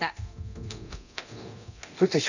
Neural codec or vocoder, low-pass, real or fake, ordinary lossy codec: codec, 24 kHz, 0.9 kbps, DualCodec; 7.2 kHz; fake; none